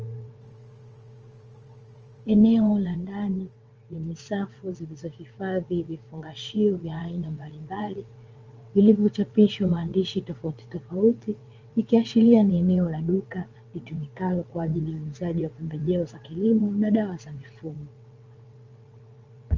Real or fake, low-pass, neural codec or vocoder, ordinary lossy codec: fake; 7.2 kHz; vocoder, 22.05 kHz, 80 mel bands, WaveNeXt; Opus, 24 kbps